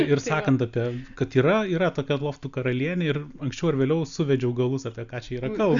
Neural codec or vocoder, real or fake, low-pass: none; real; 7.2 kHz